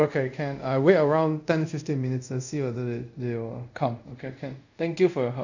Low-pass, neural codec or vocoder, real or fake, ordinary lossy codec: 7.2 kHz; codec, 24 kHz, 0.5 kbps, DualCodec; fake; none